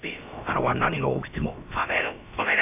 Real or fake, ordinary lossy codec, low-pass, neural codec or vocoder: fake; AAC, 32 kbps; 3.6 kHz; codec, 16 kHz, about 1 kbps, DyCAST, with the encoder's durations